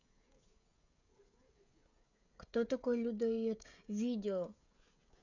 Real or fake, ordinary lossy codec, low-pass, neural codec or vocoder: fake; none; 7.2 kHz; codec, 16 kHz, 16 kbps, FreqCodec, smaller model